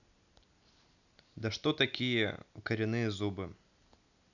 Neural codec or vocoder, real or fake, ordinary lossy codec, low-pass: none; real; none; 7.2 kHz